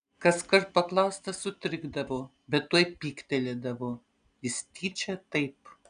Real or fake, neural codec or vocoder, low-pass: real; none; 9.9 kHz